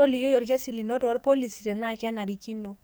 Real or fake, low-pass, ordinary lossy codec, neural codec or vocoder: fake; none; none; codec, 44.1 kHz, 2.6 kbps, SNAC